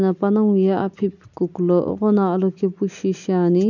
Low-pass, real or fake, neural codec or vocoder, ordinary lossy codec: 7.2 kHz; real; none; none